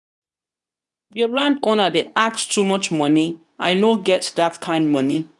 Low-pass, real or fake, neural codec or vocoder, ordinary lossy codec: 10.8 kHz; fake; codec, 24 kHz, 0.9 kbps, WavTokenizer, medium speech release version 2; none